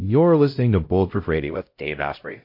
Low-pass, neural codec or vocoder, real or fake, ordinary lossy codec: 5.4 kHz; codec, 16 kHz, 0.5 kbps, X-Codec, HuBERT features, trained on LibriSpeech; fake; MP3, 32 kbps